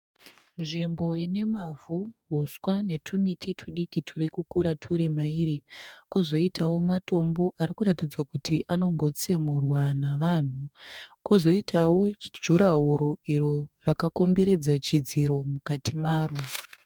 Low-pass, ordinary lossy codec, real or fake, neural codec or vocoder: 19.8 kHz; MP3, 96 kbps; fake; codec, 44.1 kHz, 2.6 kbps, DAC